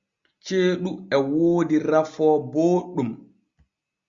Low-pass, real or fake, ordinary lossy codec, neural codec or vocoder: 7.2 kHz; real; Opus, 64 kbps; none